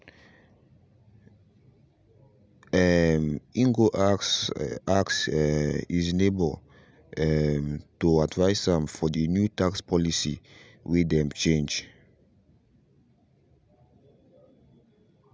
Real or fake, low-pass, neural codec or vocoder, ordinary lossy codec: real; none; none; none